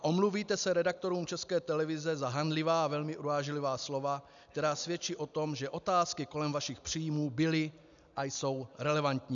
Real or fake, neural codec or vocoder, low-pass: real; none; 7.2 kHz